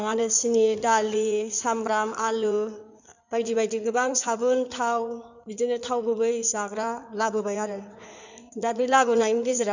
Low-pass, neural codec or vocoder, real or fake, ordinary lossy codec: 7.2 kHz; codec, 16 kHz in and 24 kHz out, 2.2 kbps, FireRedTTS-2 codec; fake; none